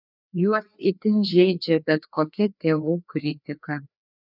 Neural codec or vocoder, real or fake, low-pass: codec, 16 kHz, 2 kbps, FreqCodec, larger model; fake; 5.4 kHz